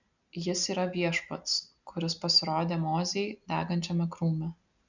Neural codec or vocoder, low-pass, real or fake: none; 7.2 kHz; real